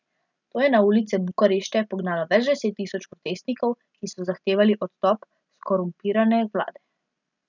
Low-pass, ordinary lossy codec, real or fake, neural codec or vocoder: 7.2 kHz; none; real; none